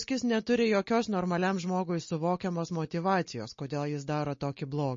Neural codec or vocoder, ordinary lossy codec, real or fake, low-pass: none; MP3, 32 kbps; real; 7.2 kHz